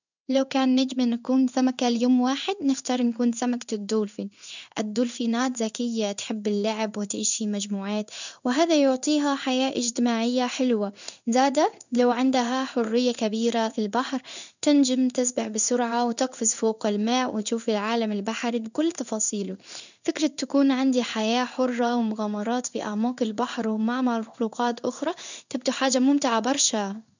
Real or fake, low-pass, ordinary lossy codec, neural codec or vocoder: fake; 7.2 kHz; none; codec, 16 kHz in and 24 kHz out, 1 kbps, XY-Tokenizer